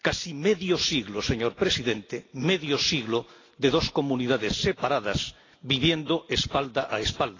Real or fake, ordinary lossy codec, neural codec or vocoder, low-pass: real; AAC, 32 kbps; none; 7.2 kHz